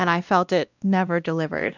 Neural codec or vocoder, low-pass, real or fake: codec, 16 kHz, 1 kbps, X-Codec, WavLM features, trained on Multilingual LibriSpeech; 7.2 kHz; fake